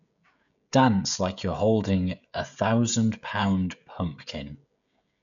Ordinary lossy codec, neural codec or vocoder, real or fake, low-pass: none; codec, 16 kHz, 16 kbps, FreqCodec, smaller model; fake; 7.2 kHz